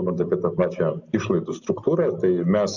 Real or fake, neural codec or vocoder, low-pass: real; none; 7.2 kHz